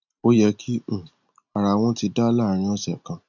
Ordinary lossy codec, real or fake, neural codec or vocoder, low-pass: none; real; none; 7.2 kHz